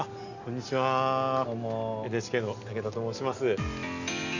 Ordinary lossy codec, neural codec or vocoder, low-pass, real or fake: none; none; 7.2 kHz; real